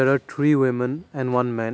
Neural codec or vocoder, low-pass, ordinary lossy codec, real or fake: none; none; none; real